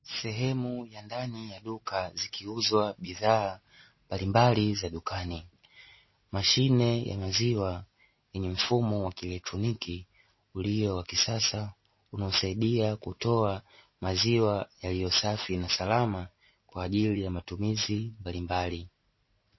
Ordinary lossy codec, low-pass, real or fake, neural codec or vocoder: MP3, 24 kbps; 7.2 kHz; real; none